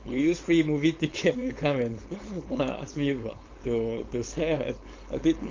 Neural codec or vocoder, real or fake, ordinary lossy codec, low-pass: codec, 16 kHz, 4.8 kbps, FACodec; fake; Opus, 32 kbps; 7.2 kHz